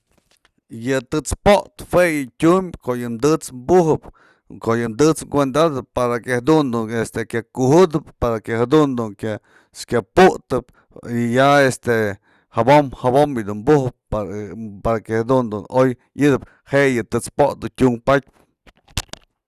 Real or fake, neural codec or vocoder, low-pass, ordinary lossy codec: real; none; 14.4 kHz; Opus, 64 kbps